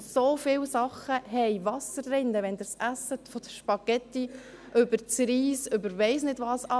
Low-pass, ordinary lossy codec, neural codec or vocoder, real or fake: none; none; none; real